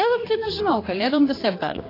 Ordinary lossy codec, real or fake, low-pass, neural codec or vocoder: AAC, 24 kbps; fake; 5.4 kHz; codec, 16 kHz, 2 kbps, X-Codec, HuBERT features, trained on general audio